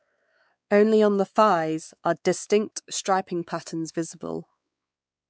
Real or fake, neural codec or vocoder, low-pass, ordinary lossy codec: fake; codec, 16 kHz, 4 kbps, X-Codec, WavLM features, trained on Multilingual LibriSpeech; none; none